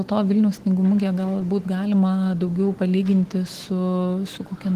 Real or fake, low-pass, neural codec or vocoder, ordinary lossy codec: fake; 14.4 kHz; autoencoder, 48 kHz, 128 numbers a frame, DAC-VAE, trained on Japanese speech; Opus, 16 kbps